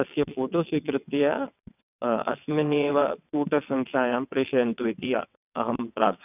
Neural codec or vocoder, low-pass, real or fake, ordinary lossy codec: vocoder, 22.05 kHz, 80 mel bands, WaveNeXt; 3.6 kHz; fake; none